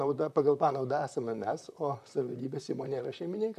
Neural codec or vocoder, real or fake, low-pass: vocoder, 44.1 kHz, 128 mel bands, Pupu-Vocoder; fake; 14.4 kHz